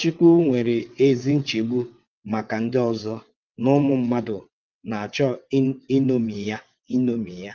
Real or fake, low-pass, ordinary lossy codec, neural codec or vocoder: fake; 7.2 kHz; Opus, 32 kbps; vocoder, 22.05 kHz, 80 mel bands, WaveNeXt